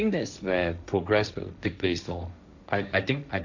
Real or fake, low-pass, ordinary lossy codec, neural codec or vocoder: fake; 7.2 kHz; none; codec, 16 kHz, 1.1 kbps, Voila-Tokenizer